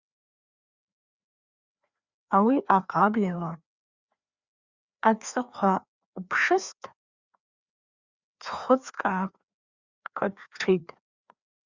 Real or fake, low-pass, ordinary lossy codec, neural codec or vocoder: fake; 7.2 kHz; Opus, 64 kbps; codec, 16 kHz, 2 kbps, FreqCodec, larger model